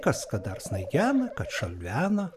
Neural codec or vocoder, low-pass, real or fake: none; 14.4 kHz; real